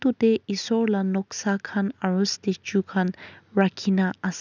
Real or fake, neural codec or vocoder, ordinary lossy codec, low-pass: real; none; none; 7.2 kHz